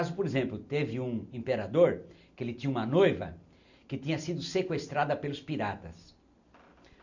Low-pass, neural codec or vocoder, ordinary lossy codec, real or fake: 7.2 kHz; none; none; real